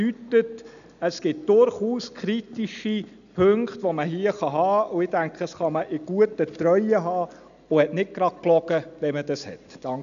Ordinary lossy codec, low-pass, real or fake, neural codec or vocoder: none; 7.2 kHz; real; none